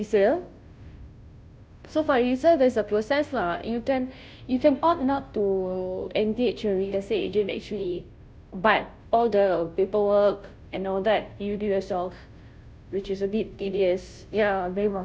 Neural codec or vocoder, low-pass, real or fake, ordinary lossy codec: codec, 16 kHz, 0.5 kbps, FunCodec, trained on Chinese and English, 25 frames a second; none; fake; none